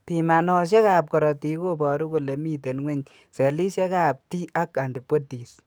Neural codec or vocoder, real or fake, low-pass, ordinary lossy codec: codec, 44.1 kHz, 7.8 kbps, DAC; fake; none; none